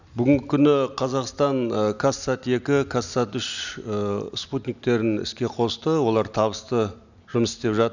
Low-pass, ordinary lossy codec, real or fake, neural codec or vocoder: 7.2 kHz; none; real; none